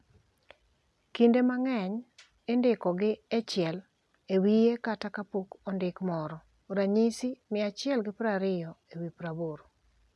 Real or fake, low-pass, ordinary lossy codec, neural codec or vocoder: real; none; none; none